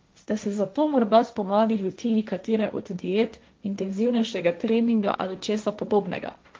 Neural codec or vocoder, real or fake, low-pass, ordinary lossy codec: codec, 16 kHz, 1.1 kbps, Voila-Tokenizer; fake; 7.2 kHz; Opus, 24 kbps